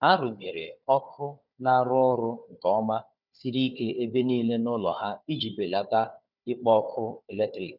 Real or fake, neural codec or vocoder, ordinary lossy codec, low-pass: fake; codec, 16 kHz, 4 kbps, FunCodec, trained on Chinese and English, 50 frames a second; none; 5.4 kHz